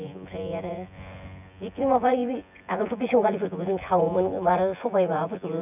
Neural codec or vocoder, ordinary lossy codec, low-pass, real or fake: vocoder, 24 kHz, 100 mel bands, Vocos; none; 3.6 kHz; fake